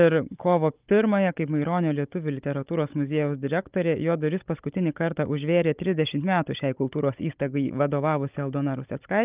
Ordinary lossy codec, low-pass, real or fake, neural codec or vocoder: Opus, 24 kbps; 3.6 kHz; fake; codec, 16 kHz, 16 kbps, FunCodec, trained on Chinese and English, 50 frames a second